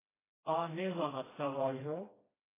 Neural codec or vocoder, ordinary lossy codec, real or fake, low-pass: codec, 16 kHz, 1 kbps, FreqCodec, smaller model; AAC, 16 kbps; fake; 3.6 kHz